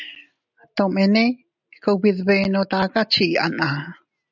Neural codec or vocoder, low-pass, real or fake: none; 7.2 kHz; real